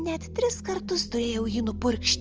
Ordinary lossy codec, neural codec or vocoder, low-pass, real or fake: Opus, 24 kbps; none; 7.2 kHz; real